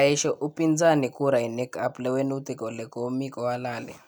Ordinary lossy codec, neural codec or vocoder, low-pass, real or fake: none; none; none; real